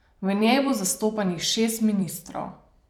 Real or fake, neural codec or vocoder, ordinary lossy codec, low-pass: fake; vocoder, 44.1 kHz, 128 mel bands every 256 samples, BigVGAN v2; none; 19.8 kHz